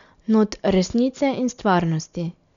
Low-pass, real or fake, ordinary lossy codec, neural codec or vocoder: 7.2 kHz; real; none; none